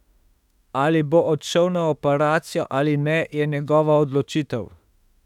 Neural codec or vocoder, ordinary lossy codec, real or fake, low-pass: autoencoder, 48 kHz, 32 numbers a frame, DAC-VAE, trained on Japanese speech; none; fake; 19.8 kHz